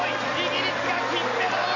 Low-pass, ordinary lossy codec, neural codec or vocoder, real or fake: 7.2 kHz; MP3, 48 kbps; none; real